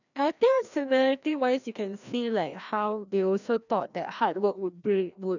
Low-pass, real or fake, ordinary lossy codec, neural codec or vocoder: 7.2 kHz; fake; none; codec, 16 kHz, 1 kbps, FreqCodec, larger model